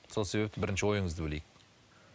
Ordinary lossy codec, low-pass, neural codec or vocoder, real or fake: none; none; none; real